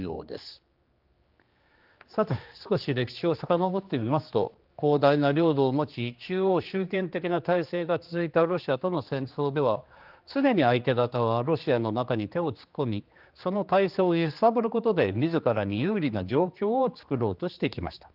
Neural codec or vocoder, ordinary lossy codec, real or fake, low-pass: codec, 16 kHz, 2 kbps, X-Codec, HuBERT features, trained on general audio; Opus, 24 kbps; fake; 5.4 kHz